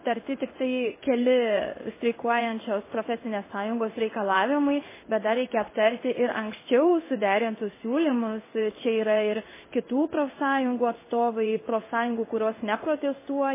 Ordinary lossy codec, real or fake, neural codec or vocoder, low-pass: MP3, 16 kbps; fake; codec, 16 kHz in and 24 kHz out, 1 kbps, XY-Tokenizer; 3.6 kHz